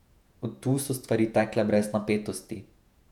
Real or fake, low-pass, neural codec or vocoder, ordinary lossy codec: fake; 19.8 kHz; vocoder, 48 kHz, 128 mel bands, Vocos; none